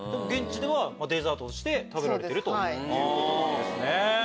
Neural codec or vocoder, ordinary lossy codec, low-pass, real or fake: none; none; none; real